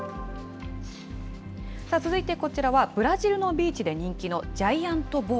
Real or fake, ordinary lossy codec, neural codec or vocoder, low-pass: real; none; none; none